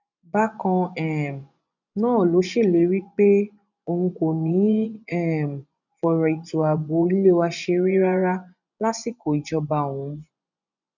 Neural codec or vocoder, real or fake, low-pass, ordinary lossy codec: vocoder, 24 kHz, 100 mel bands, Vocos; fake; 7.2 kHz; none